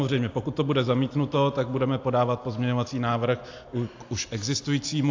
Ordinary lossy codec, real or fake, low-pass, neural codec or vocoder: AAC, 48 kbps; real; 7.2 kHz; none